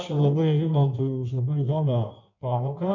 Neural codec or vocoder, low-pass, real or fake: codec, 16 kHz in and 24 kHz out, 1.1 kbps, FireRedTTS-2 codec; 7.2 kHz; fake